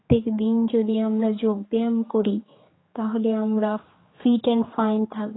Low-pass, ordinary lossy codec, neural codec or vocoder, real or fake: 7.2 kHz; AAC, 16 kbps; codec, 16 kHz, 4 kbps, X-Codec, HuBERT features, trained on general audio; fake